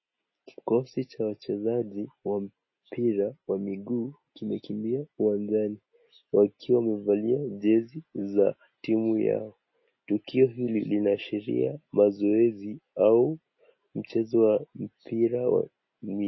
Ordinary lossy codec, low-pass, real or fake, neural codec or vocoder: MP3, 24 kbps; 7.2 kHz; real; none